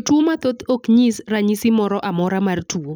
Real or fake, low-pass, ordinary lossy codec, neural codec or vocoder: real; none; none; none